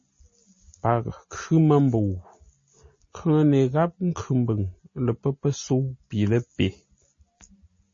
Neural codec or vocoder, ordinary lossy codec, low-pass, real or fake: none; MP3, 32 kbps; 7.2 kHz; real